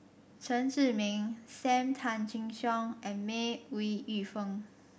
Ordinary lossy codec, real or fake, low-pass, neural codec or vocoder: none; real; none; none